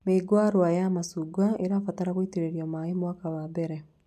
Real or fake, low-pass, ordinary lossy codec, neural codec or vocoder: real; 14.4 kHz; none; none